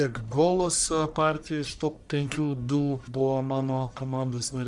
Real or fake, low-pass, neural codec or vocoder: fake; 10.8 kHz; codec, 44.1 kHz, 1.7 kbps, Pupu-Codec